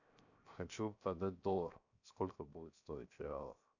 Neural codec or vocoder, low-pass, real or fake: codec, 16 kHz, 0.7 kbps, FocalCodec; 7.2 kHz; fake